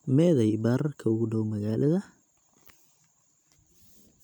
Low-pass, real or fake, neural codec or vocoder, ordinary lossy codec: 19.8 kHz; real; none; none